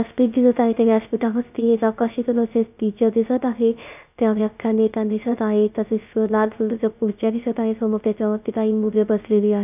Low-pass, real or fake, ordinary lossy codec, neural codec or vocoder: 3.6 kHz; fake; none; codec, 16 kHz, 0.3 kbps, FocalCodec